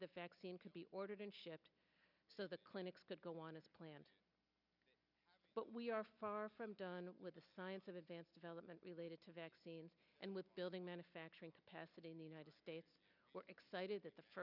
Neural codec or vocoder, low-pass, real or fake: none; 5.4 kHz; real